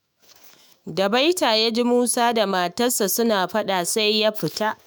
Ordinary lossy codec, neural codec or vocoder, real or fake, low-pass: none; autoencoder, 48 kHz, 128 numbers a frame, DAC-VAE, trained on Japanese speech; fake; none